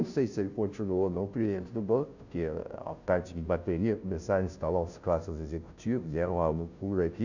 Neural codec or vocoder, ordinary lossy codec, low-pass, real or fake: codec, 16 kHz, 0.5 kbps, FunCodec, trained on Chinese and English, 25 frames a second; none; 7.2 kHz; fake